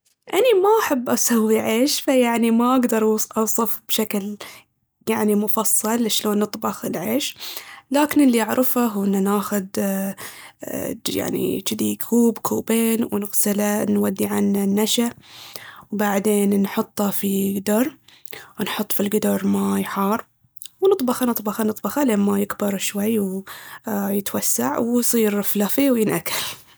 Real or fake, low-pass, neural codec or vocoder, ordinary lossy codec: real; none; none; none